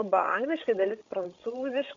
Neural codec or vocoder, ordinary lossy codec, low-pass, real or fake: codec, 16 kHz, 16 kbps, FreqCodec, larger model; AAC, 64 kbps; 7.2 kHz; fake